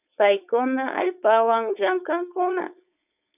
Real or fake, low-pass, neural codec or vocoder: fake; 3.6 kHz; codec, 16 kHz, 4.8 kbps, FACodec